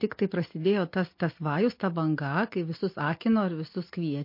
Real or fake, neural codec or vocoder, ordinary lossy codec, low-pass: real; none; MP3, 32 kbps; 5.4 kHz